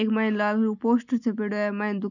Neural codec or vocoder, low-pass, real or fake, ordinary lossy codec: none; 7.2 kHz; real; none